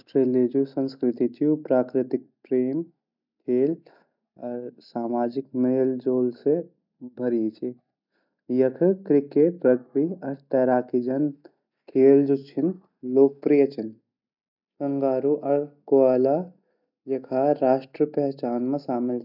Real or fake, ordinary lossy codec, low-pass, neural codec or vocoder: real; none; 5.4 kHz; none